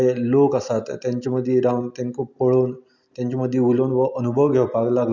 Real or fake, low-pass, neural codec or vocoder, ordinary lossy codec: real; 7.2 kHz; none; none